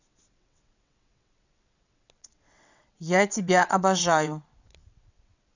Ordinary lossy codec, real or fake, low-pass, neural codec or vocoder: none; fake; 7.2 kHz; vocoder, 22.05 kHz, 80 mel bands, WaveNeXt